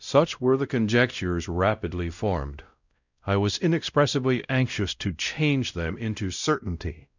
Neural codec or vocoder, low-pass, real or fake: codec, 16 kHz, 0.5 kbps, X-Codec, WavLM features, trained on Multilingual LibriSpeech; 7.2 kHz; fake